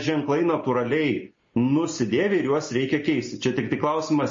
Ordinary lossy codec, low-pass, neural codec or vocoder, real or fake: MP3, 32 kbps; 7.2 kHz; none; real